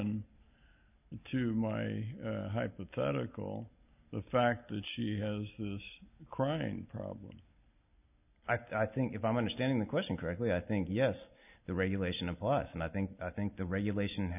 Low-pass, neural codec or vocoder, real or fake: 3.6 kHz; none; real